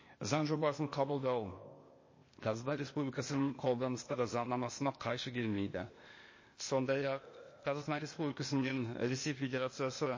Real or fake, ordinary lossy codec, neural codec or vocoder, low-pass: fake; MP3, 32 kbps; codec, 16 kHz, 0.8 kbps, ZipCodec; 7.2 kHz